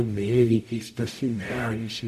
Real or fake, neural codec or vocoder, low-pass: fake; codec, 44.1 kHz, 0.9 kbps, DAC; 14.4 kHz